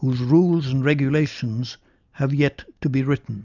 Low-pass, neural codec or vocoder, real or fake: 7.2 kHz; none; real